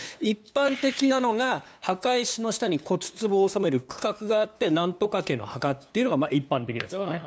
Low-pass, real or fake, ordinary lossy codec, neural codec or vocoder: none; fake; none; codec, 16 kHz, 2 kbps, FunCodec, trained on LibriTTS, 25 frames a second